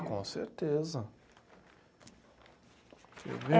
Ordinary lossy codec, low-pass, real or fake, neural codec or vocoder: none; none; real; none